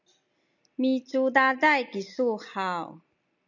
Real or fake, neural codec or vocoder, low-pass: real; none; 7.2 kHz